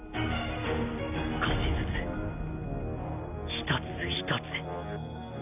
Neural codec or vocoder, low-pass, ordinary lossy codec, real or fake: none; 3.6 kHz; none; real